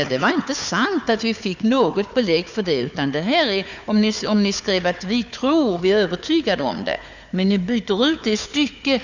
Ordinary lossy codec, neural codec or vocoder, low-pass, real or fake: none; codec, 16 kHz, 4 kbps, FunCodec, trained on Chinese and English, 50 frames a second; 7.2 kHz; fake